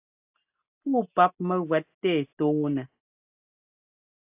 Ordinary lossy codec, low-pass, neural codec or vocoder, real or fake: AAC, 32 kbps; 3.6 kHz; none; real